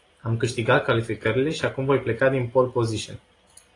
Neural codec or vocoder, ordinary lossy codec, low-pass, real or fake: none; AAC, 32 kbps; 10.8 kHz; real